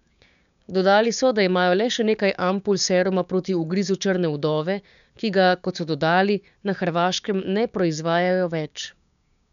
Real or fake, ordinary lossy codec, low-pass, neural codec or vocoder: fake; none; 7.2 kHz; codec, 16 kHz, 6 kbps, DAC